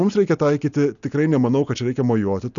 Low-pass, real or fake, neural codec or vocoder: 7.2 kHz; real; none